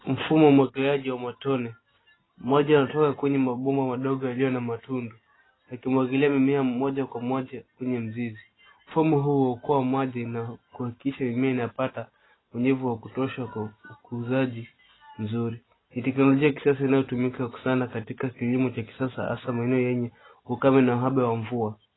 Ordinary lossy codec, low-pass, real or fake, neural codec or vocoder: AAC, 16 kbps; 7.2 kHz; real; none